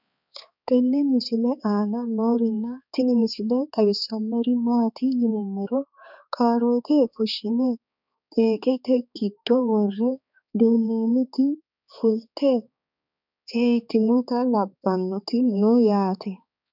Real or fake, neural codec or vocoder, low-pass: fake; codec, 16 kHz, 4 kbps, X-Codec, HuBERT features, trained on balanced general audio; 5.4 kHz